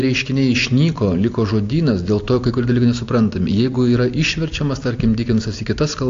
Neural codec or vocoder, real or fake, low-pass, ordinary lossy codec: none; real; 7.2 kHz; AAC, 48 kbps